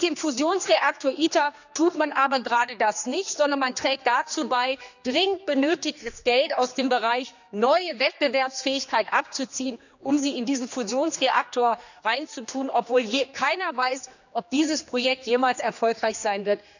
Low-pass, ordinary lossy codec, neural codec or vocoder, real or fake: 7.2 kHz; none; codec, 16 kHz, 2 kbps, X-Codec, HuBERT features, trained on general audio; fake